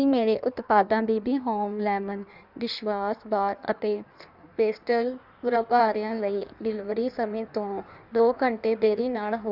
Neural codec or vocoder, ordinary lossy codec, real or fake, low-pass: codec, 16 kHz in and 24 kHz out, 1.1 kbps, FireRedTTS-2 codec; none; fake; 5.4 kHz